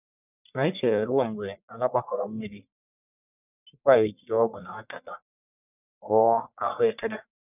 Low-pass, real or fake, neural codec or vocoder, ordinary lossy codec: 3.6 kHz; fake; codec, 44.1 kHz, 1.7 kbps, Pupu-Codec; none